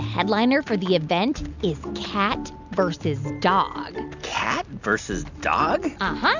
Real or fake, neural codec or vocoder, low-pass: real; none; 7.2 kHz